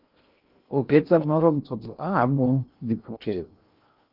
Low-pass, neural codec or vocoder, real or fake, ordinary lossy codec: 5.4 kHz; codec, 16 kHz in and 24 kHz out, 0.6 kbps, FocalCodec, streaming, 2048 codes; fake; Opus, 24 kbps